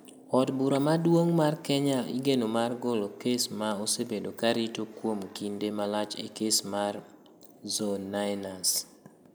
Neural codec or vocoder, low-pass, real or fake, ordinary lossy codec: none; none; real; none